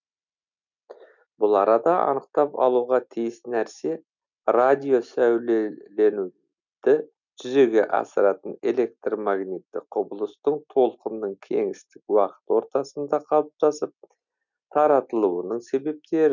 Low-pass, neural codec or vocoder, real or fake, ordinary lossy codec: 7.2 kHz; none; real; none